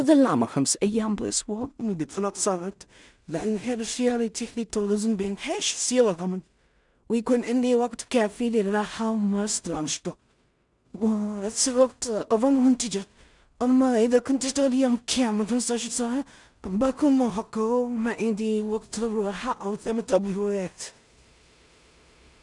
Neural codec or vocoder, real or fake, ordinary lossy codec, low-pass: codec, 16 kHz in and 24 kHz out, 0.4 kbps, LongCat-Audio-Codec, two codebook decoder; fake; none; 10.8 kHz